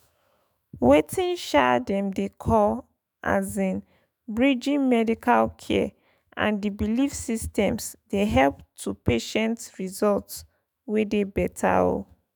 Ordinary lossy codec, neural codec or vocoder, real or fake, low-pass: none; autoencoder, 48 kHz, 128 numbers a frame, DAC-VAE, trained on Japanese speech; fake; none